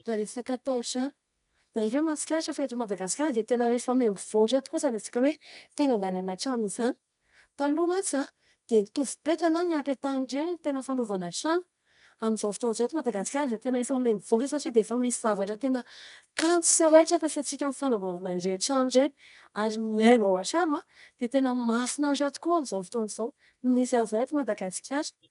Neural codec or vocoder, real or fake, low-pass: codec, 24 kHz, 0.9 kbps, WavTokenizer, medium music audio release; fake; 10.8 kHz